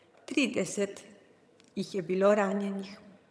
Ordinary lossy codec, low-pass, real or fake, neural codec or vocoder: none; none; fake; vocoder, 22.05 kHz, 80 mel bands, HiFi-GAN